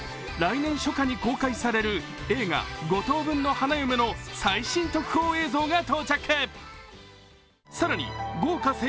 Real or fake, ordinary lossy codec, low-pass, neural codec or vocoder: real; none; none; none